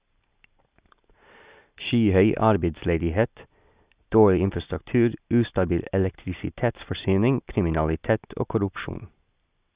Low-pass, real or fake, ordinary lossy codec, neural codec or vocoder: 3.6 kHz; real; Opus, 64 kbps; none